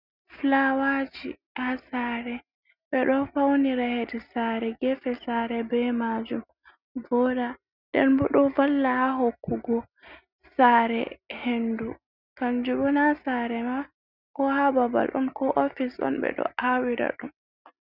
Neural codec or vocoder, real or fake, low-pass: none; real; 5.4 kHz